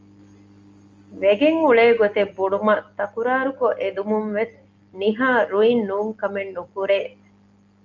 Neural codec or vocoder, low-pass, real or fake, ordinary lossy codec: none; 7.2 kHz; real; Opus, 32 kbps